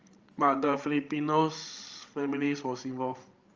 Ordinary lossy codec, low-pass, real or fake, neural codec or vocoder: Opus, 24 kbps; 7.2 kHz; fake; codec, 16 kHz, 16 kbps, FreqCodec, larger model